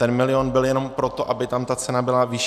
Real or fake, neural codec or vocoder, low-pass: real; none; 14.4 kHz